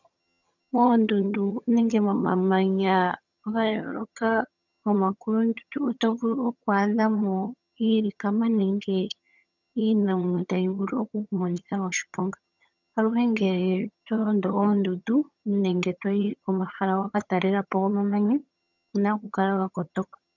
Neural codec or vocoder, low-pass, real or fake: vocoder, 22.05 kHz, 80 mel bands, HiFi-GAN; 7.2 kHz; fake